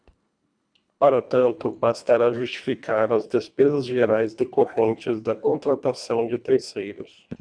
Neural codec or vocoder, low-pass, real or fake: codec, 24 kHz, 1.5 kbps, HILCodec; 9.9 kHz; fake